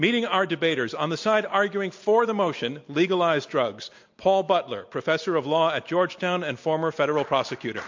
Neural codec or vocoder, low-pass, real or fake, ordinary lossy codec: none; 7.2 kHz; real; MP3, 48 kbps